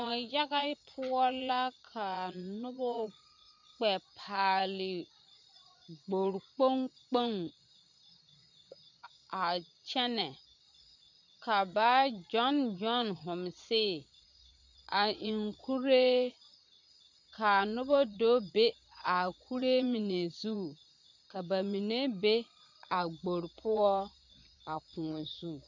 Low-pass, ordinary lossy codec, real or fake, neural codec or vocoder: 7.2 kHz; MP3, 64 kbps; fake; vocoder, 22.05 kHz, 80 mel bands, Vocos